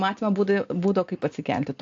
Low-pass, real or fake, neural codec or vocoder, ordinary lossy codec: 7.2 kHz; real; none; AAC, 48 kbps